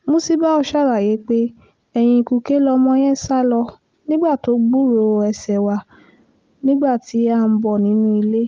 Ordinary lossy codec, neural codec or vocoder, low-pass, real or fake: Opus, 32 kbps; none; 7.2 kHz; real